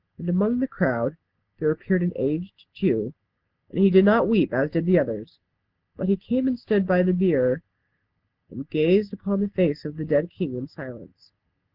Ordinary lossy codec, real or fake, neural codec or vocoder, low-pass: Opus, 16 kbps; real; none; 5.4 kHz